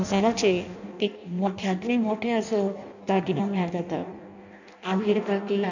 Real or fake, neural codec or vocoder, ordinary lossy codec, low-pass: fake; codec, 16 kHz in and 24 kHz out, 0.6 kbps, FireRedTTS-2 codec; none; 7.2 kHz